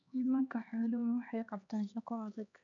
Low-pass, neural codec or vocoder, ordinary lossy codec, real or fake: 7.2 kHz; codec, 16 kHz, 2 kbps, X-Codec, HuBERT features, trained on LibriSpeech; none; fake